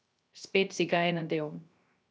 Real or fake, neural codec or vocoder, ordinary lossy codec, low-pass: fake; codec, 16 kHz, 0.3 kbps, FocalCodec; none; none